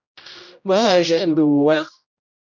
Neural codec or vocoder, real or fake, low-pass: codec, 16 kHz, 0.5 kbps, X-Codec, HuBERT features, trained on general audio; fake; 7.2 kHz